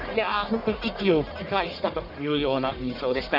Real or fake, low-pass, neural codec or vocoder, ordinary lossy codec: fake; 5.4 kHz; codec, 44.1 kHz, 1.7 kbps, Pupu-Codec; none